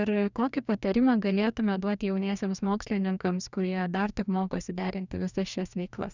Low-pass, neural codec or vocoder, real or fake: 7.2 kHz; codec, 44.1 kHz, 2.6 kbps, DAC; fake